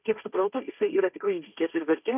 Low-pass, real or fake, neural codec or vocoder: 3.6 kHz; fake; codec, 16 kHz, 1.1 kbps, Voila-Tokenizer